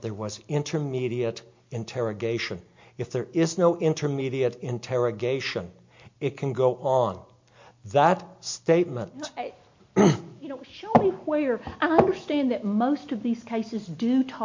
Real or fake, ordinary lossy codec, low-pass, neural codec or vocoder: real; MP3, 48 kbps; 7.2 kHz; none